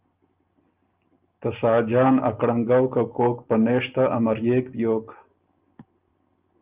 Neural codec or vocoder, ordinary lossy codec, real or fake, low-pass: none; Opus, 24 kbps; real; 3.6 kHz